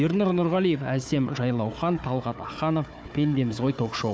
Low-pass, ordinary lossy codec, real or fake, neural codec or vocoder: none; none; fake; codec, 16 kHz, 4 kbps, FunCodec, trained on Chinese and English, 50 frames a second